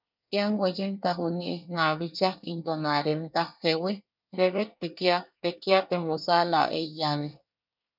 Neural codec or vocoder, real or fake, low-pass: codec, 24 kHz, 1 kbps, SNAC; fake; 5.4 kHz